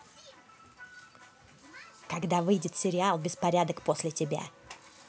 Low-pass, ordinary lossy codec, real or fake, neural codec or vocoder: none; none; real; none